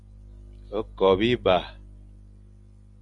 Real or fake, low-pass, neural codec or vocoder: real; 10.8 kHz; none